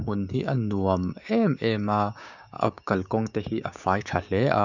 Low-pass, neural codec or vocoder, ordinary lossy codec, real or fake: 7.2 kHz; codec, 16 kHz, 6 kbps, DAC; none; fake